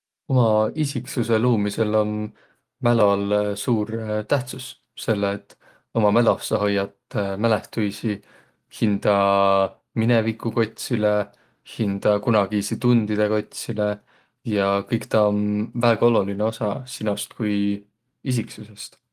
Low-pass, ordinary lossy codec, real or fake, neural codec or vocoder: 14.4 kHz; Opus, 24 kbps; real; none